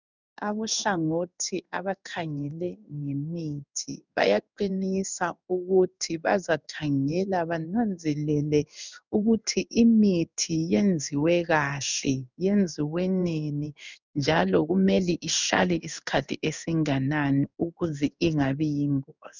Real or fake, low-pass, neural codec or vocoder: fake; 7.2 kHz; codec, 16 kHz in and 24 kHz out, 1 kbps, XY-Tokenizer